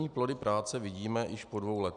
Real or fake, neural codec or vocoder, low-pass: real; none; 9.9 kHz